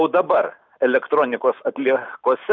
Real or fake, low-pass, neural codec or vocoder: real; 7.2 kHz; none